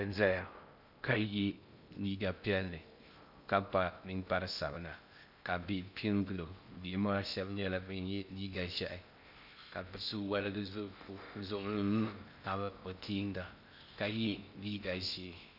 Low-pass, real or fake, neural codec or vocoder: 5.4 kHz; fake; codec, 16 kHz in and 24 kHz out, 0.6 kbps, FocalCodec, streaming, 2048 codes